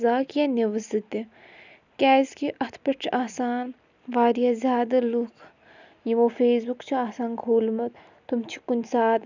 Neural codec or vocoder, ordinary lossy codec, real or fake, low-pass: none; none; real; 7.2 kHz